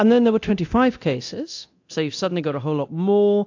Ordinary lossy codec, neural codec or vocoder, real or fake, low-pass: AAC, 48 kbps; codec, 24 kHz, 0.9 kbps, DualCodec; fake; 7.2 kHz